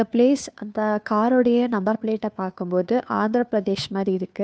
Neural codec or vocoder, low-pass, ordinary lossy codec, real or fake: codec, 16 kHz, 2 kbps, FunCodec, trained on Chinese and English, 25 frames a second; none; none; fake